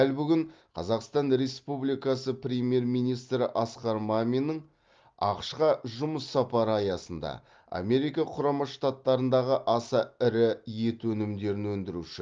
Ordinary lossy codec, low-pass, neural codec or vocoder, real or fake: Opus, 32 kbps; 7.2 kHz; none; real